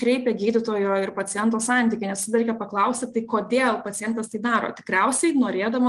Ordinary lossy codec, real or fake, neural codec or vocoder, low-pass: Opus, 24 kbps; real; none; 10.8 kHz